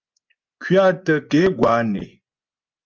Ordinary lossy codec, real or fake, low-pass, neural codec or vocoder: Opus, 24 kbps; fake; 7.2 kHz; vocoder, 24 kHz, 100 mel bands, Vocos